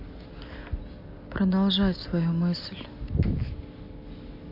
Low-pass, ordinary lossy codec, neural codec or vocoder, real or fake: 5.4 kHz; MP3, 32 kbps; none; real